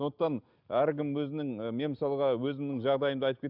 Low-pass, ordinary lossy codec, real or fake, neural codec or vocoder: 5.4 kHz; none; real; none